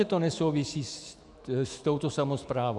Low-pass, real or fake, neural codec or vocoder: 10.8 kHz; fake; autoencoder, 48 kHz, 128 numbers a frame, DAC-VAE, trained on Japanese speech